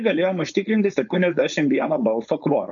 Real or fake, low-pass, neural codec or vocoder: fake; 7.2 kHz; codec, 16 kHz, 4.8 kbps, FACodec